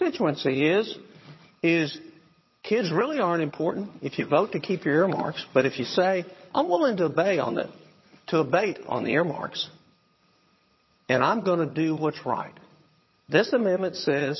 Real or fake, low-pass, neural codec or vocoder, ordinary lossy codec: fake; 7.2 kHz; vocoder, 22.05 kHz, 80 mel bands, HiFi-GAN; MP3, 24 kbps